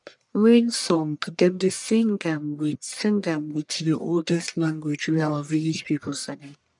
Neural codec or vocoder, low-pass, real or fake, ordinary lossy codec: codec, 44.1 kHz, 1.7 kbps, Pupu-Codec; 10.8 kHz; fake; none